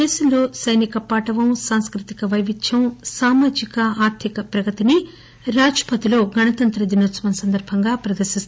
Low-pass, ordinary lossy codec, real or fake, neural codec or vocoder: none; none; real; none